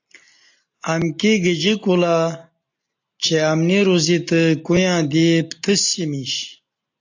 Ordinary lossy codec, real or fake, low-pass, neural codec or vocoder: AAC, 48 kbps; real; 7.2 kHz; none